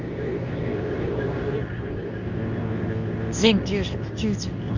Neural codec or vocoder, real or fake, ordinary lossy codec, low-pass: codec, 24 kHz, 0.9 kbps, WavTokenizer, medium speech release version 2; fake; none; 7.2 kHz